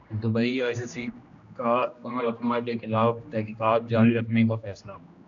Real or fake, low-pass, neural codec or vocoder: fake; 7.2 kHz; codec, 16 kHz, 1 kbps, X-Codec, HuBERT features, trained on general audio